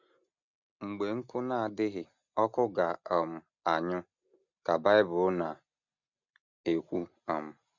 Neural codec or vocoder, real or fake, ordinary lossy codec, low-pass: none; real; none; 7.2 kHz